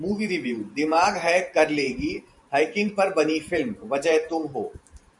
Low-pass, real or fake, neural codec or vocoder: 10.8 kHz; fake; vocoder, 44.1 kHz, 128 mel bands every 512 samples, BigVGAN v2